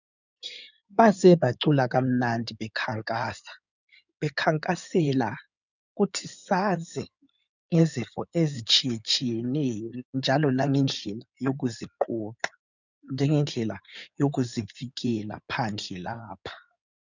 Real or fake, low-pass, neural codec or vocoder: fake; 7.2 kHz; codec, 16 kHz in and 24 kHz out, 2.2 kbps, FireRedTTS-2 codec